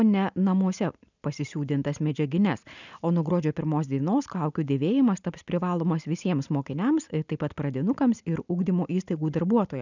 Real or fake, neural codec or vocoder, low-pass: real; none; 7.2 kHz